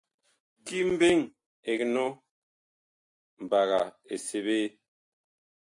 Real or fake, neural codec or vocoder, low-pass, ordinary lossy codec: real; none; 10.8 kHz; AAC, 64 kbps